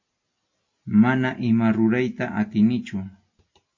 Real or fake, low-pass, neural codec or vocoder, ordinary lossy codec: real; 7.2 kHz; none; MP3, 32 kbps